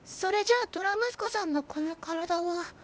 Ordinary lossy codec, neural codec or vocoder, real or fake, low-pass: none; codec, 16 kHz, 0.8 kbps, ZipCodec; fake; none